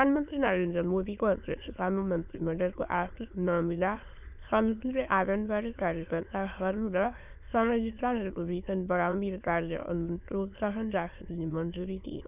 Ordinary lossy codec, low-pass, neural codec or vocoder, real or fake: none; 3.6 kHz; autoencoder, 22.05 kHz, a latent of 192 numbers a frame, VITS, trained on many speakers; fake